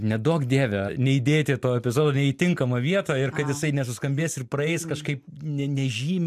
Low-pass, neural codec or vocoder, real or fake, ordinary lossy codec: 14.4 kHz; none; real; MP3, 96 kbps